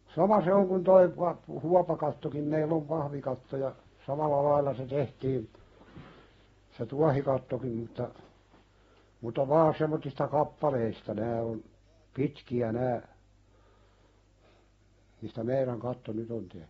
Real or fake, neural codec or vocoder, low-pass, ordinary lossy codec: real; none; 19.8 kHz; AAC, 24 kbps